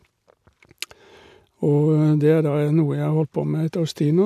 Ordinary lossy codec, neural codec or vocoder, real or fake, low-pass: none; none; real; 14.4 kHz